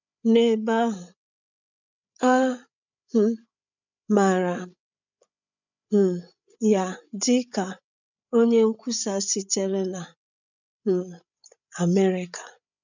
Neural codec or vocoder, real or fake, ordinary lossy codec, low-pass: codec, 16 kHz, 8 kbps, FreqCodec, larger model; fake; none; 7.2 kHz